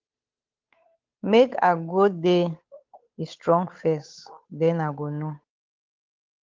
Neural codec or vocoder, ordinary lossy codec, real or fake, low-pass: codec, 16 kHz, 8 kbps, FunCodec, trained on Chinese and English, 25 frames a second; Opus, 32 kbps; fake; 7.2 kHz